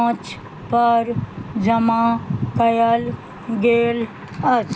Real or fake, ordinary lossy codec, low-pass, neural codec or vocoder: real; none; none; none